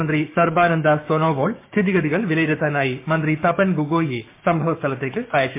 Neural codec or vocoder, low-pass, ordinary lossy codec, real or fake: codec, 16 kHz, 6 kbps, DAC; 3.6 kHz; MP3, 24 kbps; fake